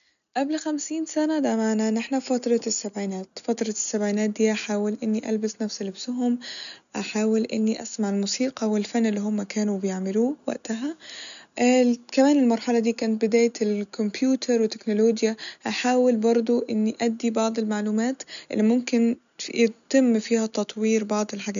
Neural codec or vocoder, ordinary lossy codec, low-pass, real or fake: none; none; 7.2 kHz; real